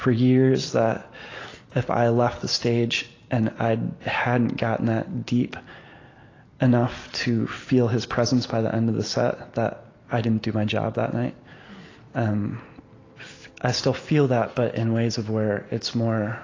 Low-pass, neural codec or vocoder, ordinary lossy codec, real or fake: 7.2 kHz; none; AAC, 32 kbps; real